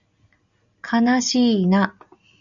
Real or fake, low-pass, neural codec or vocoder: real; 7.2 kHz; none